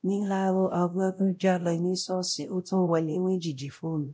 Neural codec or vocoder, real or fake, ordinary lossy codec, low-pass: codec, 16 kHz, 0.5 kbps, X-Codec, WavLM features, trained on Multilingual LibriSpeech; fake; none; none